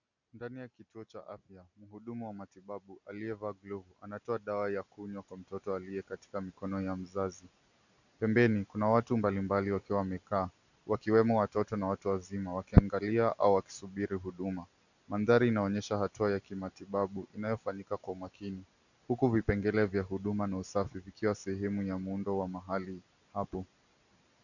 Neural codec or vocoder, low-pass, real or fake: none; 7.2 kHz; real